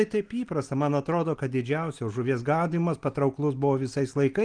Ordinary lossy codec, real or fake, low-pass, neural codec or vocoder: Opus, 24 kbps; real; 9.9 kHz; none